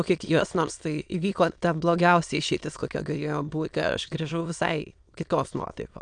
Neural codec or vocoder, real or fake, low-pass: autoencoder, 22.05 kHz, a latent of 192 numbers a frame, VITS, trained on many speakers; fake; 9.9 kHz